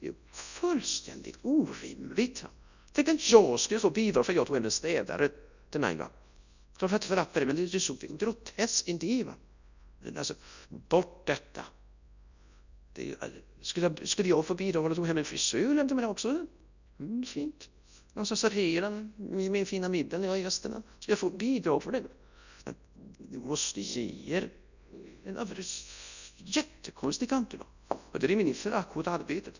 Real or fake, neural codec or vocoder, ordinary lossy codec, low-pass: fake; codec, 24 kHz, 0.9 kbps, WavTokenizer, large speech release; none; 7.2 kHz